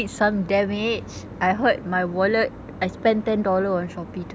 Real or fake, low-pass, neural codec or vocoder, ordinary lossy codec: real; none; none; none